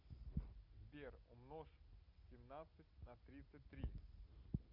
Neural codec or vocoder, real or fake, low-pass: none; real; 5.4 kHz